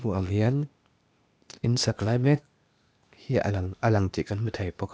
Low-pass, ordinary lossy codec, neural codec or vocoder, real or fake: none; none; codec, 16 kHz, 0.8 kbps, ZipCodec; fake